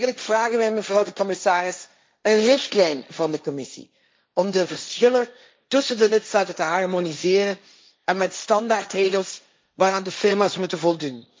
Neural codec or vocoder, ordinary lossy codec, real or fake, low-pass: codec, 16 kHz, 1.1 kbps, Voila-Tokenizer; none; fake; none